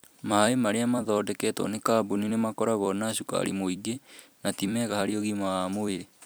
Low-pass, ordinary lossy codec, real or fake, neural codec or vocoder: none; none; fake; vocoder, 44.1 kHz, 128 mel bands every 256 samples, BigVGAN v2